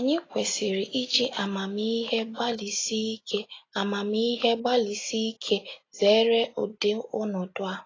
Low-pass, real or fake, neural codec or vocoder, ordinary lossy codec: 7.2 kHz; real; none; AAC, 32 kbps